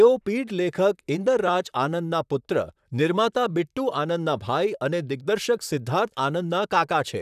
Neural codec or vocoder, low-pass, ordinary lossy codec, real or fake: vocoder, 44.1 kHz, 128 mel bands, Pupu-Vocoder; 14.4 kHz; none; fake